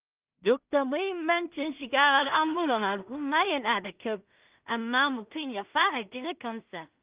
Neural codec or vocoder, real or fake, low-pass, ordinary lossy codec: codec, 16 kHz in and 24 kHz out, 0.4 kbps, LongCat-Audio-Codec, two codebook decoder; fake; 3.6 kHz; Opus, 24 kbps